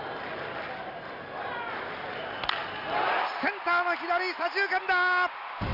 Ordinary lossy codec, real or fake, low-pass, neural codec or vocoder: none; real; 5.4 kHz; none